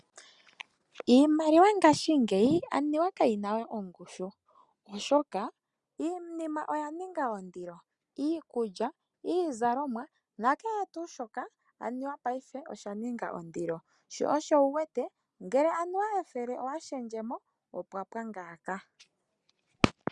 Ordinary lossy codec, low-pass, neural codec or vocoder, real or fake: Opus, 64 kbps; 10.8 kHz; none; real